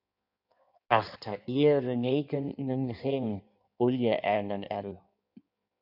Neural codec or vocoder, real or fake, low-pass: codec, 16 kHz in and 24 kHz out, 1.1 kbps, FireRedTTS-2 codec; fake; 5.4 kHz